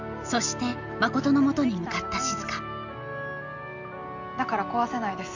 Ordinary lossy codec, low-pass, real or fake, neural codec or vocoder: none; 7.2 kHz; real; none